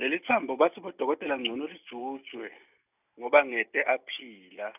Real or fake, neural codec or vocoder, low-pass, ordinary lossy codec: real; none; 3.6 kHz; none